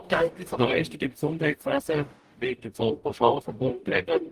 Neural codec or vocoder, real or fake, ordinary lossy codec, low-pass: codec, 44.1 kHz, 0.9 kbps, DAC; fake; Opus, 32 kbps; 14.4 kHz